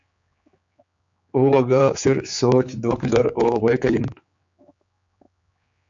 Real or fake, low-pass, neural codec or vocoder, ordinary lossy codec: fake; 7.2 kHz; codec, 16 kHz, 4 kbps, X-Codec, HuBERT features, trained on general audio; MP3, 48 kbps